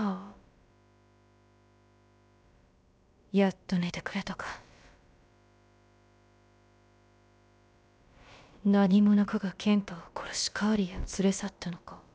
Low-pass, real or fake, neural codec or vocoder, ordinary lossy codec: none; fake; codec, 16 kHz, about 1 kbps, DyCAST, with the encoder's durations; none